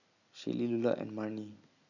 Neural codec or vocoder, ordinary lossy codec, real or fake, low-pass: none; none; real; 7.2 kHz